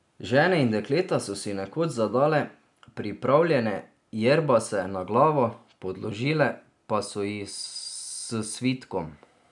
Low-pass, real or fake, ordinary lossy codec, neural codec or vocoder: 10.8 kHz; real; none; none